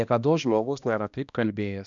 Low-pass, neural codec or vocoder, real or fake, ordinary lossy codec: 7.2 kHz; codec, 16 kHz, 1 kbps, X-Codec, HuBERT features, trained on balanced general audio; fake; MP3, 96 kbps